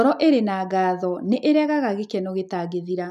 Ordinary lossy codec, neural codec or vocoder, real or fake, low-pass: none; none; real; 14.4 kHz